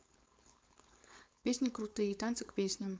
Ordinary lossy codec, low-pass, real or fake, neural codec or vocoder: none; none; fake; codec, 16 kHz, 4.8 kbps, FACodec